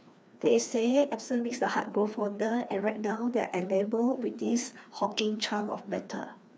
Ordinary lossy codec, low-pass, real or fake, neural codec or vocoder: none; none; fake; codec, 16 kHz, 2 kbps, FreqCodec, larger model